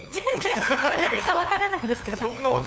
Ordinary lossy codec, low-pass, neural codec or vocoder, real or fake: none; none; codec, 16 kHz, 2 kbps, FunCodec, trained on LibriTTS, 25 frames a second; fake